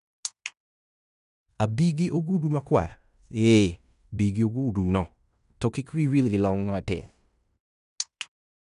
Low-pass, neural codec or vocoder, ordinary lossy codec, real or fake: 10.8 kHz; codec, 16 kHz in and 24 kHz out, 0.9 kbps, LongCat-Audio-Codec, fine tuned four codebook decoder; none; fake